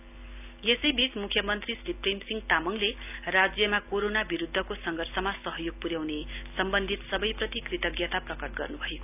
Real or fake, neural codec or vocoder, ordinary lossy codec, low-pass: real; none; none; 3.6 kHz